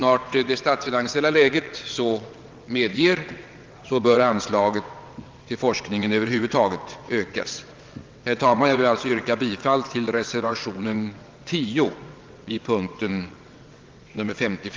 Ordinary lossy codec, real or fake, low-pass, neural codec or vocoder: Opus, 32 kbps; fake; 7.2 kHz; vocoder, 22.05 kHz, 80 mel bands, WaveNeXt